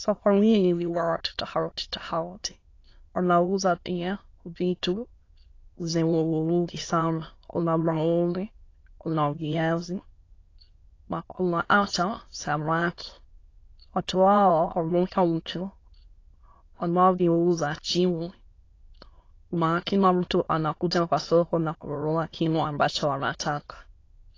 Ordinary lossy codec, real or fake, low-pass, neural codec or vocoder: AAC, 32 kbps; fake; 7.2 kHz; autoencoder, 22.05 kHz, a latent of 192 numbers a frame, VITS, trained on many speakers